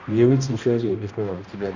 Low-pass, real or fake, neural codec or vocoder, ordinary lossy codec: 7.2 kHz; fake; codec, 16 kHz, 1 kbps, X-Codec, HuBERT features, trained on balanced general audio; Opus, 64 kbps